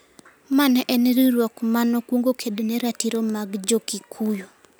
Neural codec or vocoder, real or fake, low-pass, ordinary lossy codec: none; real; none; none